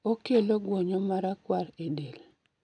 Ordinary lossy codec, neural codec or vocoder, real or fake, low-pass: none; none; real; 9.9 kHz